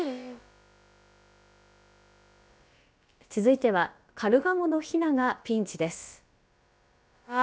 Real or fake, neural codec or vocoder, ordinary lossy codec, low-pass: fake; codec, 16 kHz, about 1 kbps, DyCAST, with the encoder's durations; none; none